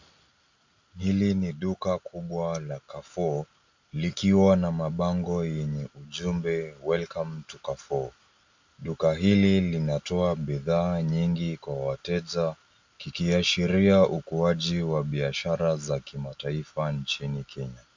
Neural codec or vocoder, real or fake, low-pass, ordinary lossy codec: none; real; 7.2 kHz; MP3, 64 kbps